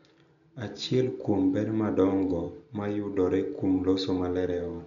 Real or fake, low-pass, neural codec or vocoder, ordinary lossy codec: real; 7.2 kHz; none; none